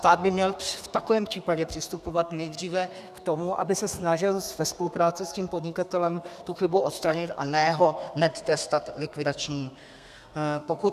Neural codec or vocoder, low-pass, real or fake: codec, 32 kHz, 1.9 kbps, SNAC; 14.4 kHz; fake